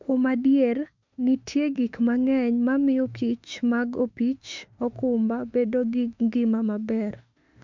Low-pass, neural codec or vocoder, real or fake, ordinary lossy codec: 7.2 kHz; autoencoder, 48 kHz, 32 numbers a frame, DAC-VAE, trained on Japanese speech; fake; MP3, 64 kbps